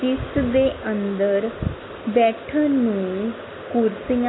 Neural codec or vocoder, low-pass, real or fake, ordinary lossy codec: none; 7.2 kHz; real; AAC, 16 kbps